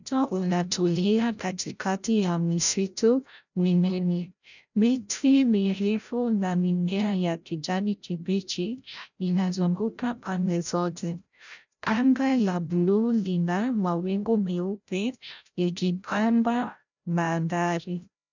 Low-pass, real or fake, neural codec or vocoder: 7.2 kHz; fake; codec, 16 kHz, 0.5 kbps, FreqCodec, larger model